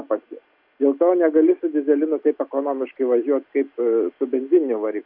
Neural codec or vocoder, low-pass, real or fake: none; 5.4 kHz; real